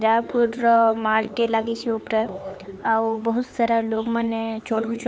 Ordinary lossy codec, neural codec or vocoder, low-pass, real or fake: none; codec, 16 kHz, 4 kbps, X-Codec, HuBERT features, trained on LibriSpeech; none; fake